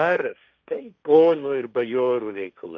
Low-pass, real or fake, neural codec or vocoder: 7.2 kHz; fake; codec, 16 kHz, 1.1 kbps, Voila-Tokenizer